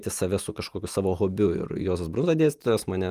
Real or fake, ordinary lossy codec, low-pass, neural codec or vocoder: real; Opus, 32 kbps; 14.4 kHz; none